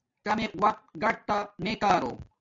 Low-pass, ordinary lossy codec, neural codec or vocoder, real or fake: 7.2 kHz; MP3, 64 kbps; none; real